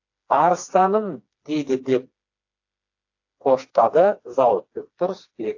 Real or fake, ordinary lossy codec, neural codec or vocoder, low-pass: fake; AAC, 48 kbps; codec, 16 kHz, 2 kbps, FreqCodec, smaller model; 7.2 kHz